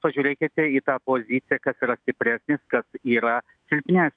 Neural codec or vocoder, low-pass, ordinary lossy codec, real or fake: autoencoder, 48 kHz, 128 numbers a frame, DAC-VAE, trained on Japanese speech; 9.9 kHz; AAC, 64 kbps; fake